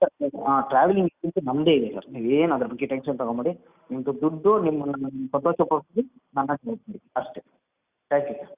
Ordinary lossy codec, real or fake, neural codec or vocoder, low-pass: Opus, 64 kbps; real; none; 3.6 kHz